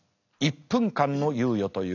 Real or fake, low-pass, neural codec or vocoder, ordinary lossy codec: real; 7.2 kHz; none; none